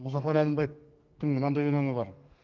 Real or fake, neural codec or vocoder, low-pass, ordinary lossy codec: fake; codec, 32 kHz, 1.9 kbps, SNAC; 7.2 kHz; Opus, 24 kbps